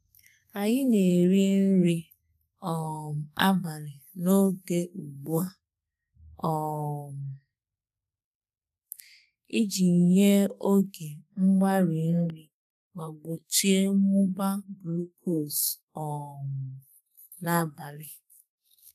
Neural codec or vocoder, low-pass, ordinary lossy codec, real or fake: codec, 32 kHz, 1.9 kbps, SNAC; 14.4 kHz; none; fake